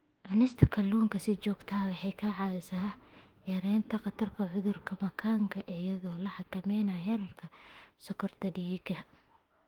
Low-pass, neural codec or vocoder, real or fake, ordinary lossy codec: 19.8 kHz; autoencoder, 48 kHz, 32 numbers a frame, DAC-VAE, trained on Japanese speech; fake; Opus, 24 kbps